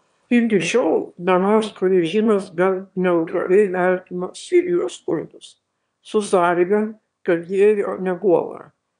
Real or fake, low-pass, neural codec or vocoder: fake; 9.9 kHz; autoencoder, 22.05 kHz, a latent of 192 numbers a frame, VITS, trained on one speaker